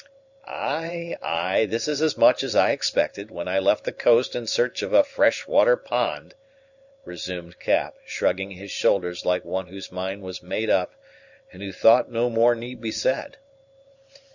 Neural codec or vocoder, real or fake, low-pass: vocoder, 44.1 kHz, 128 mel bands every 512 samples, BigVGAN v2; fake; 7.2 kHz